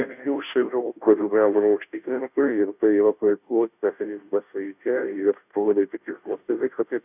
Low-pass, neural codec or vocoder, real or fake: 3.6 kHz; codec, 16 kHz, 0.5 kbps, FunCodec, trained on Chinese and English, 25 frames a second; fake